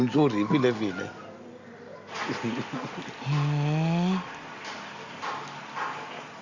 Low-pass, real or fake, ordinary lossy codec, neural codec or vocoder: 7.2 kHz; real; Opus, 64 kbps; none